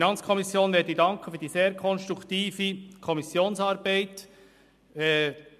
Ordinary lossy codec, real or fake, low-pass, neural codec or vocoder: MP3, 96 kbps; real; 14.4 kHz; none